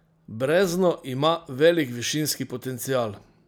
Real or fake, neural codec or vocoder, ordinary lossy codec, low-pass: real; none; none; none